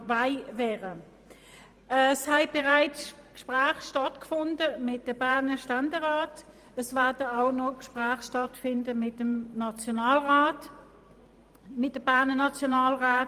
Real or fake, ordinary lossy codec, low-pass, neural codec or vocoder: fake; Opus, 32 kbps; 14.4 kHz; vocoder, 44.1 kHz, 128 mel bands every 512 samples, BigVGAN v2